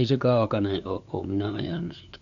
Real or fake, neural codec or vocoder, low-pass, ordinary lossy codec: fake; codec, 16 kHz, 2 kbps, FunCodec, trained on LibriTTS, 25 frames a second; 7.2 kHz; none